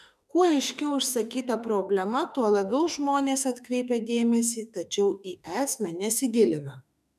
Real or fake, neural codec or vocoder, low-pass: fake; autoencoder, 48 kHz, 32 numbers a frame, DAC-VAE, trained on Japanese speech; 14.4 kHz